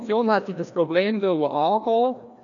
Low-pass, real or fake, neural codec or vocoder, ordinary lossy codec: 7.2 kHz; fake; codec, 16 kHz, 1 kbps, FreqCodec, larger model; none